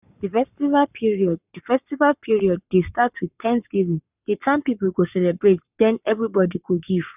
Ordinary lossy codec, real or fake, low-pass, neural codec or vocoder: none; fake; 3.6 kHz; codec, 44.1 kHz, 7.8 kbps, Pupu-Codec